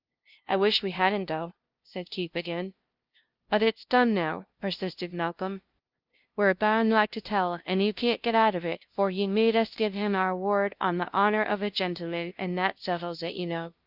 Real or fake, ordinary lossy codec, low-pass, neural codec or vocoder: fake; Opus, 24 kbps; 5.4 kHz; codec, 16 kHz, 0.5 kbps, FunCodec, trained on LibriTTS, 25 frames a second